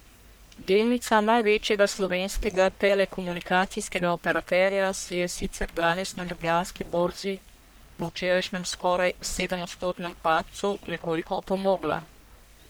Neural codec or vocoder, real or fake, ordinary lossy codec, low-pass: codec, 44.1 kHz, 1.7 kbps, Pupu-Codec; fake; none; none